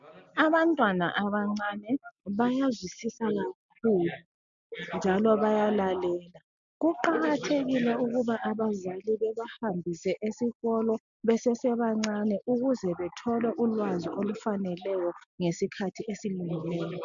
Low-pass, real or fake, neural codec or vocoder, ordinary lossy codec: 7.2 kHz; real; none; MP3, 96 kbps